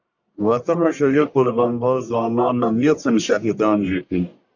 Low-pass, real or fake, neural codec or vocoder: 7.2 kHz; fake; codec, 44.1 kHz, 1.7 kbps, Pupu-Codec